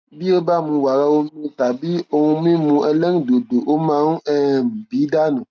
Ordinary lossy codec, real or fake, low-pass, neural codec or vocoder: none; real; none; none